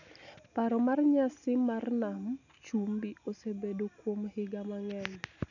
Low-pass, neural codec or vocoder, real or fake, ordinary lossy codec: 7.2 kHz; none; real; none